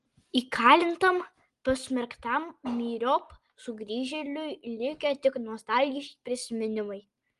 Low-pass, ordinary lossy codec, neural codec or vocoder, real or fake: 10.8 kHz; Opus, 24 kbps; none; real